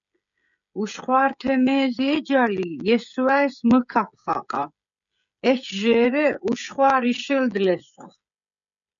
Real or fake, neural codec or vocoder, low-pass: fake; codec, 16 kHz, 16 kbps, FreqCodec, smaller model; 7.2 kHz